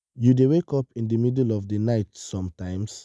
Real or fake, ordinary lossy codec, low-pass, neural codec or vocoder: real; none; none; none